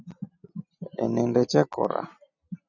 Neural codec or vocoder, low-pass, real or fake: none; 7.2 kHz; real